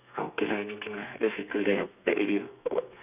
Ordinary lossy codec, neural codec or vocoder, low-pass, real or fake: none; codec, 32 kHz, 1.9 kbps, SNAC; 3.6 kHz; fake